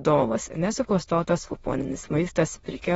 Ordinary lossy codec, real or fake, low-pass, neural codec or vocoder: AAC, 24 kbps; fake; 9.9 kHz; autoencoder, 22.05 kHz, a latent of 192 numbers a frame, VITS, trained on many speakers